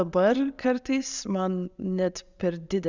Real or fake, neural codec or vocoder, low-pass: real; none; 7.2 kHz